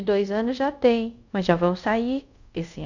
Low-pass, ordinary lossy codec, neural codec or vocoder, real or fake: 7.2 kHz; none; codec, 24 kHz, 0.5 kbps, DualCodec; fake